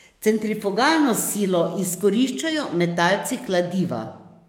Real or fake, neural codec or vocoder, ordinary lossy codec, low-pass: fake; codec, 44.1 kHz, 7.8 kbps, DAC; MP3, 96 kbps; 19.8 kHz